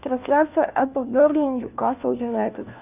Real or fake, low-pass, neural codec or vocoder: fake; 3.6 kHz; codec, 16 kHz, 1 kbps, FunCodec, trained on LibriTTS, 50 frames a second